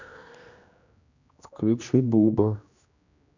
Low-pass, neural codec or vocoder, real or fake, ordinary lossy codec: 7.2 kHz; codec, 16 kHz, 1 kbps, X-Codec, HuBERT features, trained on general audio; fake; none